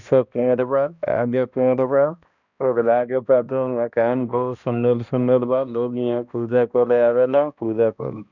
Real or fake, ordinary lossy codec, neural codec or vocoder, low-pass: fake; none; codec, 16 kHz, 1 kbps, X-Codec, HuBERT features, trained on balanced general audio; 7.2 kHz